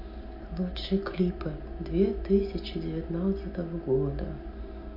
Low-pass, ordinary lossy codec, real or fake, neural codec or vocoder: 5.4 kHz; MP3, 32 kbps; real; none